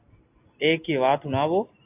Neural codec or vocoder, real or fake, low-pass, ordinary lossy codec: none; real; 3.6 kHz; AAC, 24 kbps